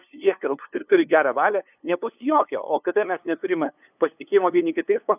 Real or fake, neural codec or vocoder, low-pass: fake; codec, 16 kHz, 2 kbps, FunCodec, trained on LibriTTS, 25 frames a second; 3.6 kHz